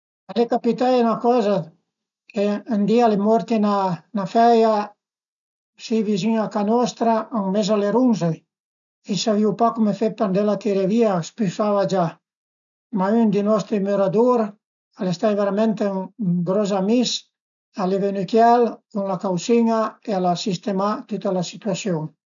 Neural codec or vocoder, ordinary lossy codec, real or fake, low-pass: none; none; real; 7.2 kHz